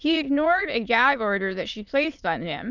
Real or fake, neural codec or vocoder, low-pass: fake; autoencoder, 22.05 kHz, a latent of 192 numbers a frame, VITS, trained on many speakers; 7.2 kHz